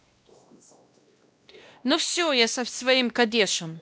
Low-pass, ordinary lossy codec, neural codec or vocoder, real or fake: none; none; codec, 16 kHz, 1 kbps, X-Codec, WavLM features, trained on Multilingual LibriSpeech; fake